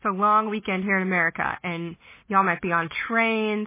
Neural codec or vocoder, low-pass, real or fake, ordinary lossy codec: none; 3.6 kHz; real; MP3, 16 kbps